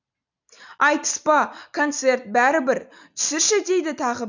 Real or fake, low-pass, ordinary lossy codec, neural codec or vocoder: real; 7.2 kHz; none; none